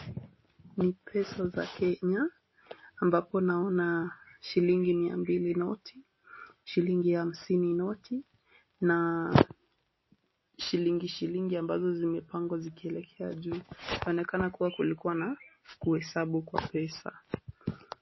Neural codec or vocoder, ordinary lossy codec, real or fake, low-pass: none; MP3, 24 kbps; real; 7.2 kHz